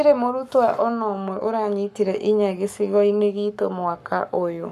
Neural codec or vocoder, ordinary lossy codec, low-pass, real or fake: codec, 44.1 kHz, 7.8 kbps, Pupu-Codec; none; 14.4 kHz; fake